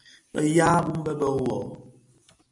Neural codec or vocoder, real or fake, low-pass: none; real; 10.8 kHz